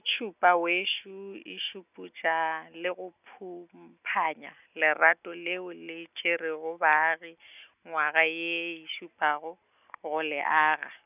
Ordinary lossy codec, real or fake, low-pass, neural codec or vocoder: none; real; 3.6 kHz; none